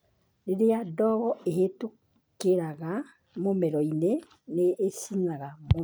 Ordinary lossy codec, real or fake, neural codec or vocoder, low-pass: none; real; none; none